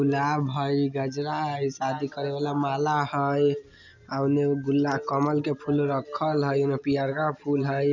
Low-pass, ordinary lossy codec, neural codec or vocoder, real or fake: 7.2 kHz; none; none; real